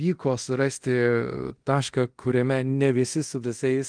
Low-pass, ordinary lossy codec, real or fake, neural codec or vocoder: 9.9 kHz; Opus, 24 kbps; fake; codec, 24 kHz, 0.5 kbps, DualCodec